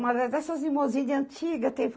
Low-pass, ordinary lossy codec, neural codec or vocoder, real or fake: none; none; none; real